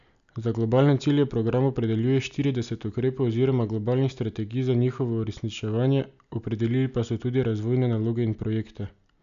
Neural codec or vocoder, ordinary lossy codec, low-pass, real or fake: none; AAC, 96 kbps; 7.2 kHz; real